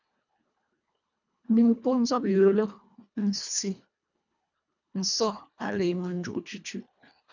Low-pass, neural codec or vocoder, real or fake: 7.2 kHz; codec, 24 kHz, 1.5 kbps, HILCodec; fake